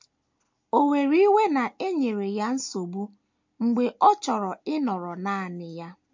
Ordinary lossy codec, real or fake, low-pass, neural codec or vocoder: MP3, 48 kbps; real; 7.2 kHz; none